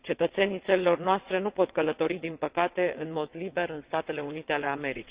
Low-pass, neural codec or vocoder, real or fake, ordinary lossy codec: 3.6 kHz; vocoder, 22.05 kHz, 80 mel bands, WaveNeXt; fake; Opus, 64 kbps